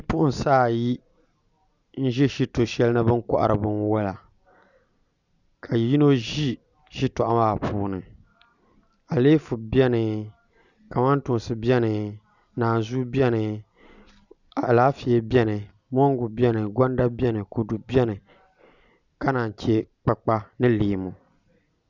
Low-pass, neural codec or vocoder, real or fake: 7.2 kHz; none; real